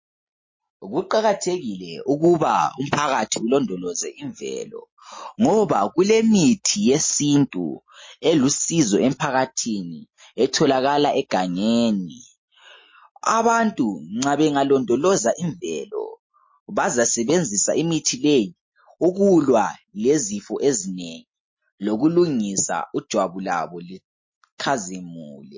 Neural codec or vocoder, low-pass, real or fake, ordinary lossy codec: none; 7.2 kHz; real; MP3, 32 kbps